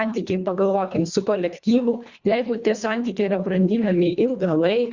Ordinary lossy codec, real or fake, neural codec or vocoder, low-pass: Opus, 64 kbps; fake; codec, 24 kHz, 1.5 kbps, HILCodec; 7.2 kHz